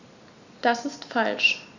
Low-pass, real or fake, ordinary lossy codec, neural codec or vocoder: 7.2 kHz; real; none; none